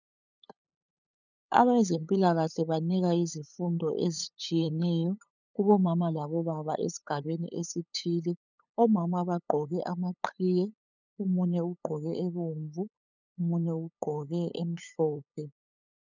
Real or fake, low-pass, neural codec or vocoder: fake; 7.2 kHz; codec, 16 kHz, 8 kbps, FunCodec, trained on LibriTTS, 25 frames a second